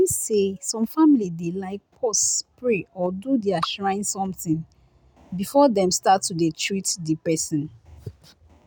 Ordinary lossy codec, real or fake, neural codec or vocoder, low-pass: none; real; none; none